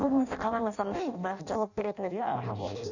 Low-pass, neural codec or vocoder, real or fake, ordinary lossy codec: 7.2 kHz; codec, 16 kHz in and 24 kHz out, 0.6 kbps, FireRedTTS-2 codec; fake; none